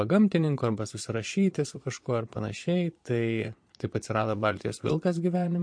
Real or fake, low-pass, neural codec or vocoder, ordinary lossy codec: fake; 9.9 kHz; vocoder, 22.05 kHz, 80 mel bands, Vocos; MP3, 48 kbps